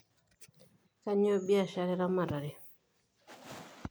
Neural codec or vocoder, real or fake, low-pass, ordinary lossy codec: none; real; none; none